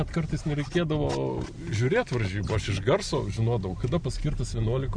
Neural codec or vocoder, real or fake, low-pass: none; real; 9.9 kHz